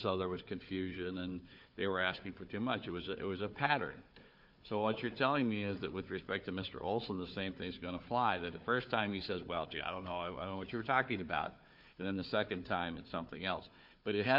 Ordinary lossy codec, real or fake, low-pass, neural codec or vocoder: MP3, 48 kbps; fake; 5.4 kHz; codec, 16 kHz, 4 kbps, FunCodec, trained on Chinese and English, 50 frames a second